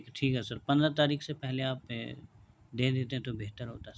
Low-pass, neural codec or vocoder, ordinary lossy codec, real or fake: none; none; none; real